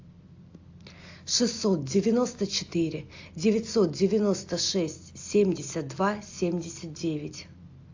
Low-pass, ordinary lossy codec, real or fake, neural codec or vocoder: 7.2 kHz; AAC, 48 kbps; real; none